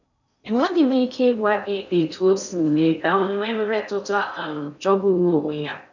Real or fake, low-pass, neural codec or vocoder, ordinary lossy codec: fake; 7.2 kHz; codec, 16 kHz in and 24 kHz out, 0.6 kbps, FocalCodec, streaming, 4096 codes; none